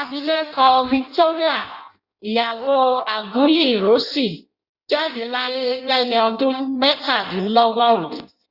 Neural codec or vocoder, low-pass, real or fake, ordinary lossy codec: codec, 16 kHz in and 24 kHz out, 0.6 kbps, FireRedTTS-2 codec; 5.4 kHz; fake; Opus, 64 kbps